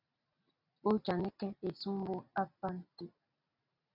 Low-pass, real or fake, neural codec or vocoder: 5.4 kHz; real; none